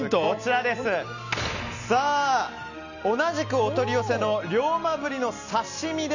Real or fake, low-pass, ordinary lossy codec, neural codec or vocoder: real; 7.2 kHz; none; none